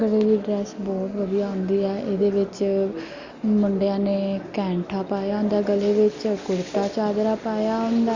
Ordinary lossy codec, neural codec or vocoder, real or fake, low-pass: Opus, 64 kbps; none; real; 7.2 kHz